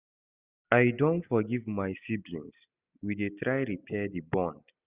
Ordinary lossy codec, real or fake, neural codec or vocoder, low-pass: Opus, 64 kbps; real; none; 3.6 kHz